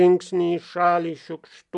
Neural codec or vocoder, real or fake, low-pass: none; real; 10.8 kHz